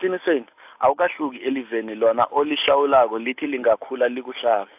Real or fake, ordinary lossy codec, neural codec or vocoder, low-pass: real; AAC, 32 kbps; none; 3.6 kHz